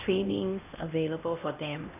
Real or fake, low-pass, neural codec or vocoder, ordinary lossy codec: fake; 3.6 kHz; codec, 16 kHz, 1 kbps, X-Codec, HuBERT features, trained on LibriSpeech; none